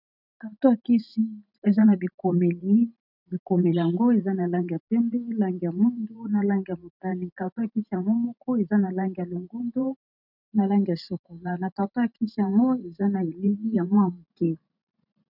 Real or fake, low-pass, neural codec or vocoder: fake; 5.4 kHz; vocoder, 44.1 kHz, 128 mel bands every 512 samples, BigVGAN v2